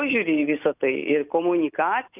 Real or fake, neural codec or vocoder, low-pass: real; none; 3.6 kHz